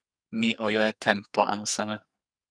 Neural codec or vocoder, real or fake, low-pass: codec, 44.1 kHz, 2.6 kbps, SNAC; fake; 9.9 kHz